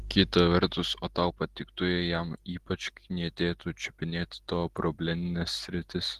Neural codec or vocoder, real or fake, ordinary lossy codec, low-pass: none; real; Opus, 16 kbps; 14.4 kHz